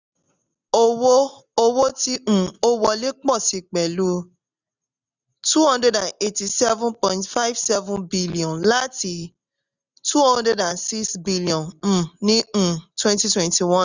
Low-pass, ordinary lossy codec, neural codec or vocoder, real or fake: 7.2 kHz; none; none; real